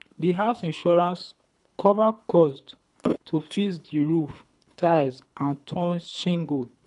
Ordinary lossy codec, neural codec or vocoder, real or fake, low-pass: none; codec, 24 kHz, 3 kbps, HILCodec; fake; 10.8 kHz